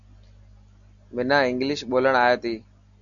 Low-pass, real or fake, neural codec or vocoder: 7.2 kHz; real; none